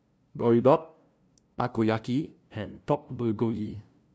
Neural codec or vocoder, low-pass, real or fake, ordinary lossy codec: codec, 16 kHz, 0.5 kbps, FunCodec, trained on LibriTTS, 25 frames a second; none; fake; none